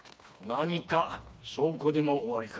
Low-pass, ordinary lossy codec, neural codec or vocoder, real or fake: none; none; codec, 16 kHz, 2 kbps, FreqCodec, smaller model; fake